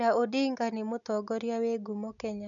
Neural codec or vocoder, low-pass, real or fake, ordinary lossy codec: none; 7.2 kHz; real; none